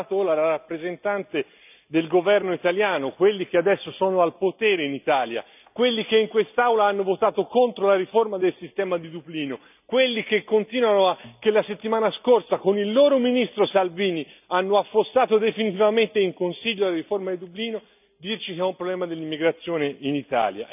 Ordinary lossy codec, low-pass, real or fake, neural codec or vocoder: none; 3.6 kHz; real; none